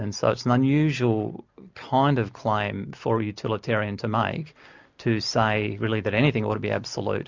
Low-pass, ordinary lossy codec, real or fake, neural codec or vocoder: 7.2 kHz; MP3, 64 kbps; real; none